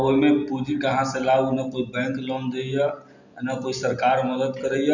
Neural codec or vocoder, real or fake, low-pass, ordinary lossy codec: none; real; 7.2 kHz; none